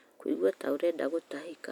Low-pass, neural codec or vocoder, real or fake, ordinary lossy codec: 19.8 kHz; none; real; none